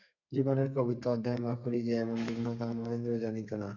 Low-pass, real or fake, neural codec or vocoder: 7.2 kHz; fake; codec, 32 kHz, 1.9 kbps, SNAC